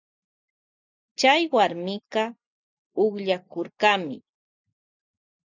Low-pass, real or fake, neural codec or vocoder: 7.2 kHz; real; none